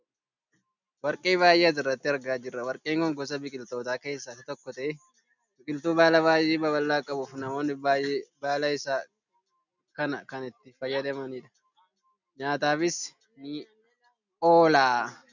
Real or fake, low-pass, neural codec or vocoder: real; 7.2 kHz; none